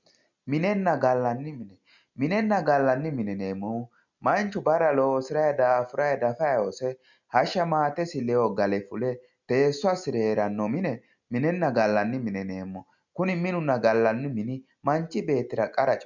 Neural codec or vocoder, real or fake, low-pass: none; real; 7.2 kHz